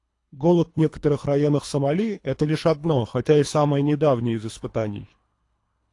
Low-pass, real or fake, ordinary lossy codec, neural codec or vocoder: 10.8 kHz; fake; AAC, 48 kbps; codec, 24 kHz, 3 kbps, HILCodec